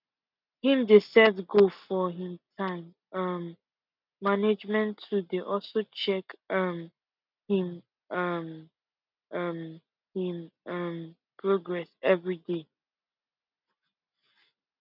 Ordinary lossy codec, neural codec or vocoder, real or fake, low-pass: none; none; real; 5.4 kHz